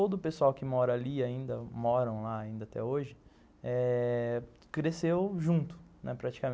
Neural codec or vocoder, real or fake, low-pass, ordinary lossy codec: none; real; none; none